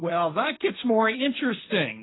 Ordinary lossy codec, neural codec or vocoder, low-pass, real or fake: AAC, 16 kbps; codec, 16 kHz, 1.1 kbps, Voila-Tokenizer; 7.2 kHz; fake